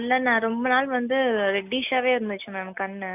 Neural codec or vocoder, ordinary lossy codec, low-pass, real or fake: none; none; 3.6 kHz; real